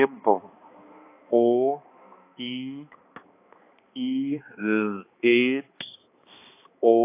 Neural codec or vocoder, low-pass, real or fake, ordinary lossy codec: codec, 16 kHz, 2 kbps, X-Codec, HuBERT features, trained on balanced general audio; 3.6 kHz; fake; AAC, 24 kbps